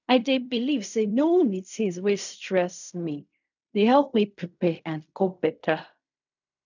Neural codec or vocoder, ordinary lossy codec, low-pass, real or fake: codec, 16 kHz in and 24 kHz out, 0.4 kbps, LongCat-Audio-Codec, fine tuned four codebook decoder; none; 7.2 kHz; fake